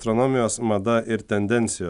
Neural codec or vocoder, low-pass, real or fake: none; 10.8 kHz; real